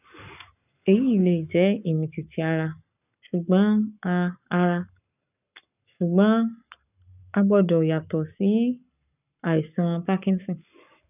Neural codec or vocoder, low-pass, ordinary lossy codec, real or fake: codec, 44.1 kHz, 7.8 kbps, Pupu-Codec; 3.6 kHz; none; fake